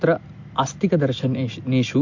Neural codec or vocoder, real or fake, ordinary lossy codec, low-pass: none; real; MP3, 48 kbps; 7.2 kHz